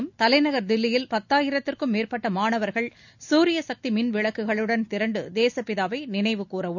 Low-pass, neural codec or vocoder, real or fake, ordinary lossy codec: 7.2 kHz; none; real; none